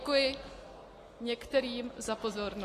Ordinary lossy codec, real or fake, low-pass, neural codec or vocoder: AAC, 48 kbps; real; 14.4 kHz; none